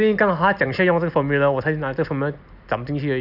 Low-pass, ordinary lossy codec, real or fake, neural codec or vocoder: 5.4 kHz; none; real; none